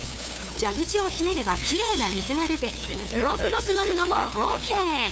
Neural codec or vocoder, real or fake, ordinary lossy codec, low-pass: codec, 16 kHz, 2 kbps, FunCodec, trained on LibriTTS, 25 frames a second; fake; none; none